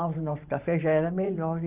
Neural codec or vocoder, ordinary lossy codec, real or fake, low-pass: vocoder, 44.1 kHz, 128 mel bands every 512 samples, BigVGAN v2; Opus, 24 kbps; fake; 3.6 kHz